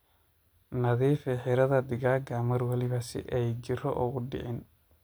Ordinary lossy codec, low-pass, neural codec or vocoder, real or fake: none; none; none; real